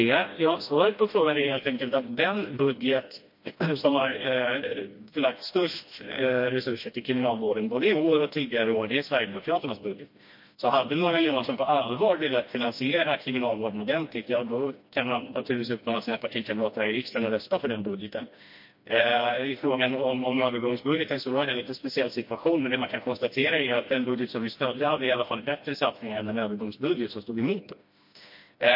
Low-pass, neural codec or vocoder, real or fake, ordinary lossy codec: 5.4 kHz; codec, 16 kHz, 1 kbps, FreqCodec, smaller model; fake; MP3, 32 kbps